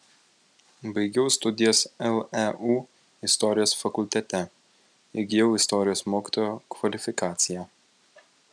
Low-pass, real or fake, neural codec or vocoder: 9.9 kHz; real; none